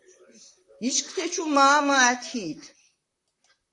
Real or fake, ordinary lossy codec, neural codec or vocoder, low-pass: fake; AAC, 48 kbps; vocoder, 44.1 kHz, 128 mel bands, Pupu-Vocoder; 10.8 kHz